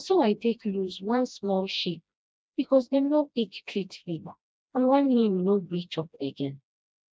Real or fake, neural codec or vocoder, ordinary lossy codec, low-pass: fake; codec, 16 kHz, 1 kbps, FreqCodec, smaller model; none; none